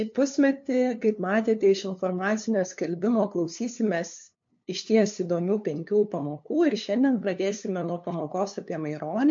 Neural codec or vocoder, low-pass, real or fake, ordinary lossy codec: codec, 16 kHz, 2 kbps, FunCodec, trained on LibriTTS, 25 frames a second; 7.2 kHz; fake; MP3, 48 kbps